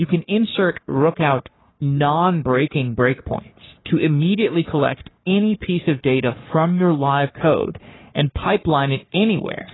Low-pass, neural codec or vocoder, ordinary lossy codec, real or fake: 7.2 kHz; codec, 44.1 kHz, 3.4 kbps, Pupu-Codec; AAC, 16 kbps; fake